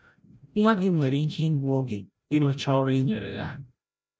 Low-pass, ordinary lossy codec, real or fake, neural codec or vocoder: none; none; fake; codec, 16 kHz, 0.5 kbps, FreqCodec, larger model